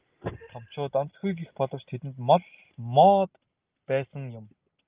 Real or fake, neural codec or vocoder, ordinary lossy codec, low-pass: real; none; Opus, 24 kbps; 3.6 kHz